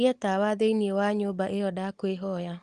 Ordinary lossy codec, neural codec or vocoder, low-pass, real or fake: Opus, 24 kbps; none; 10.8 kHz; real